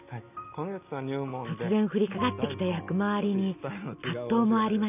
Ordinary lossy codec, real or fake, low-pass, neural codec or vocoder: none; real; 3.6 kHz; none